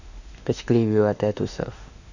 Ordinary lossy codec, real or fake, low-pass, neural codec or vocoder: none; fake; 7.2 kHz; autoencoder, 48 kHz, 32 numbers a frame, DAC-VAE, trained on Japanese speech